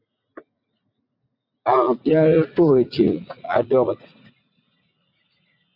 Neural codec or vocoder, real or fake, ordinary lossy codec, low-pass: vocoder, 44.1 kHz, 80 mel bands, Vocos; fake; MP3, 48 kbps; 5.4 kHz